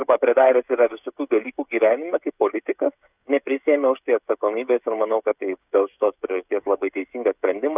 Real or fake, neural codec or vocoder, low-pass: fake; codec, 16 kHz, 16 kbps, FreqCodec, smaller model; 3.6 kHz